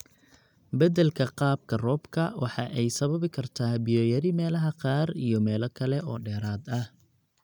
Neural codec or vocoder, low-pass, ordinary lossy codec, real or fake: none; 19.8 kHz; none; real